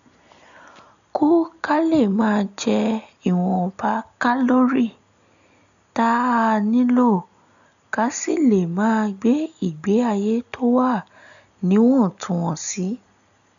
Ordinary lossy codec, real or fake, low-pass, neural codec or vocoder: none; real; 7.2 kHz; none